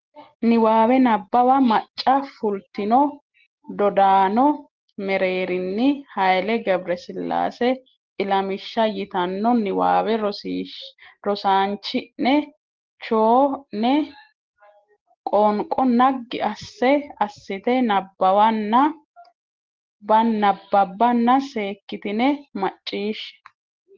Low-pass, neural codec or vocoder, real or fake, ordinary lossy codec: 7.2 kHz; none; real; Opus, 16 kbps